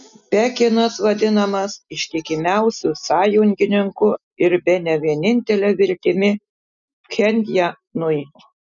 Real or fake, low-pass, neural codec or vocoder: real; 7.2 kHz; none